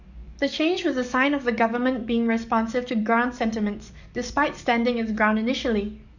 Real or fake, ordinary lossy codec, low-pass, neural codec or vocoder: fake; none; 7.2 kHz; codec, 44.1 kHz, 7.8 kbps, DAC